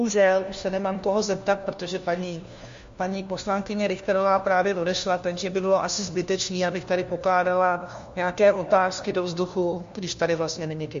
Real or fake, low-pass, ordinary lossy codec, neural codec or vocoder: fake; 7.2 kHz; MP3, 48 kbps; codec, 16 kHz, 1 kbps, FunCodec, trained on LibriTTS, 50 frames a second